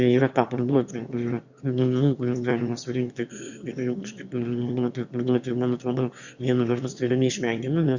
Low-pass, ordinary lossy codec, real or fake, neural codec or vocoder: 7.2 kHz; Opus, 64 kbps; fake; autoencoder, 22.05 kHz, a latent of 192 numbers a frame, VITS, trained on one speaker